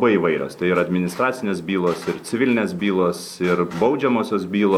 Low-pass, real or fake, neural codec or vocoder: 19.8 kHz; real; none